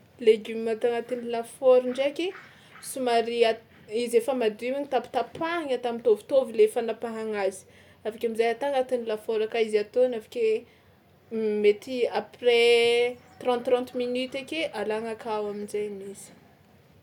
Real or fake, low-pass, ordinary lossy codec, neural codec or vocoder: real; 19.8 kHz; none; none